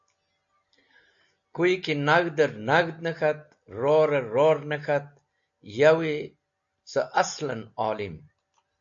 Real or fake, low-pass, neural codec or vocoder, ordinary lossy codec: real; 7.2 kHz; none; AAC, 64 kbps